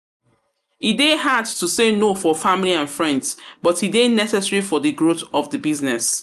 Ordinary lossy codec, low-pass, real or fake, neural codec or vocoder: none; 14.4 kHz; real; none